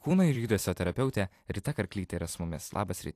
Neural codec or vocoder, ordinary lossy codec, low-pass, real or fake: vocoder, 44.1 kHz, 128 mel bands every 256 samples, BigVGAN v2; AAC, 64 kbps; 14.4 kHz; fake